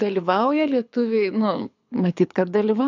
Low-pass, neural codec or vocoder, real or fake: 7.2 kHz; codec, 24 kHz, 6 kbps, HILCodec; fake